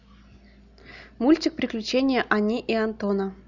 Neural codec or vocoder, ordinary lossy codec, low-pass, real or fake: none; none; 7.2 kHz; real